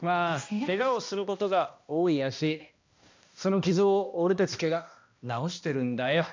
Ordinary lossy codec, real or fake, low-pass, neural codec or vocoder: MP3, 64 kbps; fake; 7.2 kHz; codec, 16 kHz, 1 kbps, X-Codec, HuBERT features, trained on balanced general audio